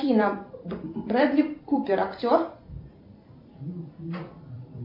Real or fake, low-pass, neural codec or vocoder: fake; 5.4 kHz; vocoder, 44.1 kHz, 128 mel bands every 256 samples, BigVGAN v2